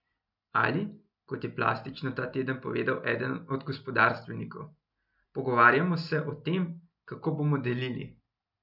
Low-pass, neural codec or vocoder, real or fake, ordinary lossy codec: 5.4 kHz; none; real; none